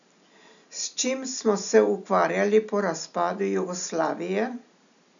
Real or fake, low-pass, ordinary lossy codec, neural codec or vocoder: real; 7.2 kHz; none; none